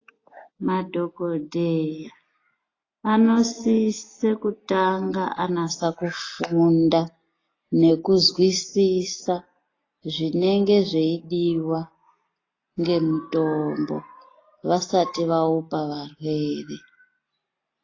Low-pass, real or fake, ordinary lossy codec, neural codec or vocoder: 7.2 kHz; real; AAC, 32 kbps; none